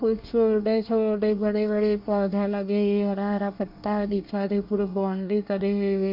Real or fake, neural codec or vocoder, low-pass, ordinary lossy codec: fake; codec, 24 kHz, 1 kbps, SNAC; 5.4 kHz; MP3, 48 kbps